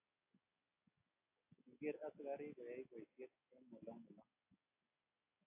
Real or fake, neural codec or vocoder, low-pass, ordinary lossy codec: real; none; 3.6 kHz; AAC, 32 kbps